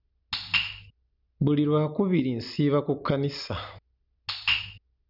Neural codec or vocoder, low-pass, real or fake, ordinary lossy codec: none; 5.4 kHz; real; none